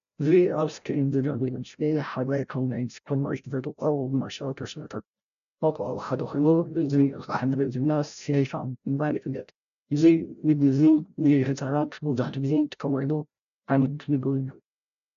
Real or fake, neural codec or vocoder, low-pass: fake; codec, 16 kHz, 0.5 kbps, FreqCodec, larger model; 7.2 kHz